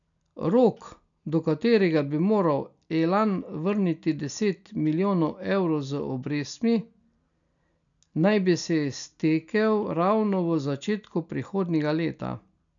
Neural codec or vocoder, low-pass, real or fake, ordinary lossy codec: none; 7.2 kHz; real; MP3, 96 kbps